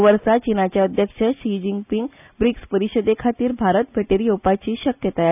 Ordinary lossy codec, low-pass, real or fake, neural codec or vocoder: none; 3.6 kHz; real; none